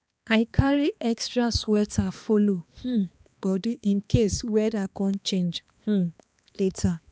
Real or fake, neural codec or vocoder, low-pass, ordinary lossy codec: fake; codec, 16 kHz, 2 kbps, X-Codec, HuBERT features, trained on balanced general audio; none; none